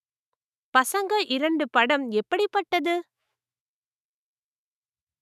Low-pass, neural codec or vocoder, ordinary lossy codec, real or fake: 14.4 kHz; autoencoder, 48 kHz, 128 numbers a frame, DAC-VAE, trained on Japanese speech; none; fake